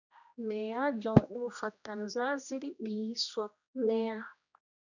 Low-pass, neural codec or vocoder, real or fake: 7.2 kHz; codec, 16 kHz, 1 kbps, X-Codec, HuBERT features, trained on general audio; fake